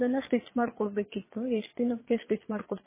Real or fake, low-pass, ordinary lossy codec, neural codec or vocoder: fake; 3.6 kHz; MP3, 16 kbps; vocoder, 22.05 kHz, 80 mel bands, Vocos